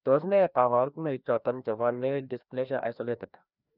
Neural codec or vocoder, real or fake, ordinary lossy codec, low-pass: codec, 16 kHz, 1 kbps, FreqCodec, larger model; fake; none; 5.4 kHz